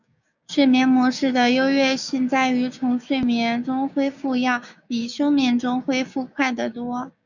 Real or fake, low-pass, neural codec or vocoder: fake; 7.2 kHz; codec, 16 kHz, 6 kbps, DAC